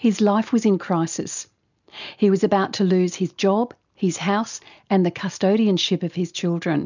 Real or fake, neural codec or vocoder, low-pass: real; none; 7.2 kHz